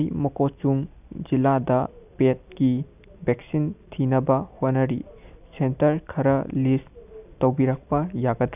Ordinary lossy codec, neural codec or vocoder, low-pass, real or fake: none; none; 3.6 kHz; real